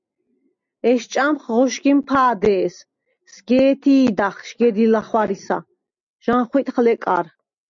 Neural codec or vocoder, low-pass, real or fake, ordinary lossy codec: none; 7.2 kHz; real; MP3, 64 kbps